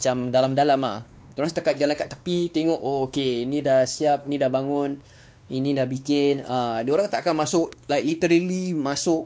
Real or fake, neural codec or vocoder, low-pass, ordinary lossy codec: fake; codec, 16 kHz, 2 kbps, X-Codec, WavLM features, trained on Multilingual LibriSpeech; none; none